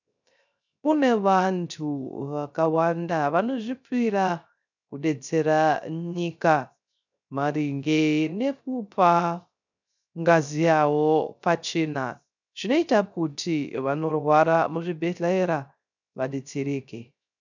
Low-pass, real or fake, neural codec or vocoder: 7.2 kHz; fake; codec, 16 kHz, 0.3 kbps, FocalCodec